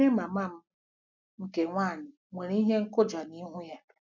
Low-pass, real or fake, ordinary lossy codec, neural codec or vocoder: 7.2 kHz; real; none; none